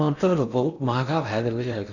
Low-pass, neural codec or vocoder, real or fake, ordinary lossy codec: 7.2 kHz; codec, 16 kHz in and 24 kHz out, 0.6 kbps, FocalCodec, streaming, 4096 codes; fake; none